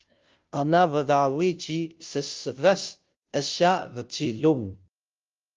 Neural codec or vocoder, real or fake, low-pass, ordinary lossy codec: codec, 16 kHz, 0.5 kbps, FunCodec, trained on Chinese and English, 25 frames a second; fake; 7.2 kHz; Opus, 32 kbps